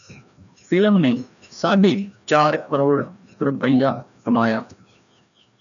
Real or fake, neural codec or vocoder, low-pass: fake; codec, 16 kHz, 1 kbps, FreqCodec, larger model; 7.2 kHz